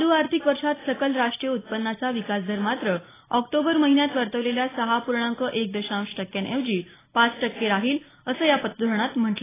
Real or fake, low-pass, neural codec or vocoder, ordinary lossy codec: real; 3.6 kHz; none; AAC, 16 kbps